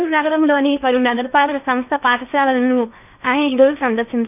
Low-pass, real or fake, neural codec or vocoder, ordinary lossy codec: 3.6 kHz; fake; codec, 16 kHz in and 24 kHz out, 0.8 kbps, FocalCodec, streaming, 65536 codes; none